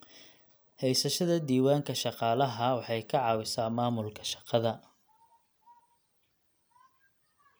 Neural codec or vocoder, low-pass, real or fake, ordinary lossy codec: none; none; real; none